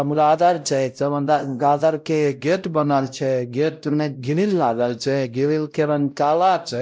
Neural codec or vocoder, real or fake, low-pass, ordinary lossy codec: codec, 16 kHz, 0.5 kbps, X-Codec, WavLM features, trained on Multilingual LibriSpeech; fake; none; none